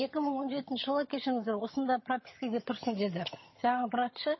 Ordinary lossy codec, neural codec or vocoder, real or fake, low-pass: MP3, 24 kbps; vocoder, 22.05 kHz, 80 mel bands, HiFi-GAN; fake; 7.2 kHz